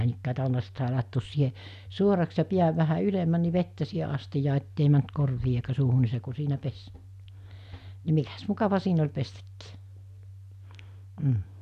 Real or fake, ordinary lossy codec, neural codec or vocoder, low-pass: real; none; none; 14.4 kHz